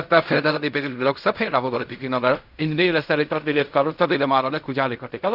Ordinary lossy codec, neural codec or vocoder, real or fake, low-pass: none; codec, 16 kHz in and 24 kHz out, 0.4 kbps, LongCat-Audio-Codec, fine tuned four codebook decoder; fake; 5.4 kHz